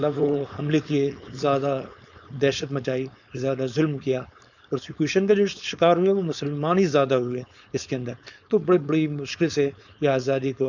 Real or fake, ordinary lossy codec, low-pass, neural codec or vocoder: fake; none; 7.2 kHz; codec, 16 kHz, 4.8 kbps, FACodec